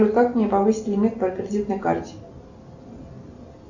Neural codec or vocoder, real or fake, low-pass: none; real; 7.2 kHz